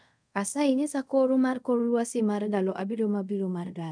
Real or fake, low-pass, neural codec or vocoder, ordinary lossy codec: fake; 9.9 kHz; codec, 24 kHz, 0.5 kbps, DualCodec; none